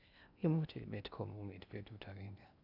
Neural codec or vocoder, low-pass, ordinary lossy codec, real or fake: codec, 16 kHz in and 24 kHz out, 0.6 kbps, FocalCodec, streaming, 2048 codes; 5.4 kHz; none; fake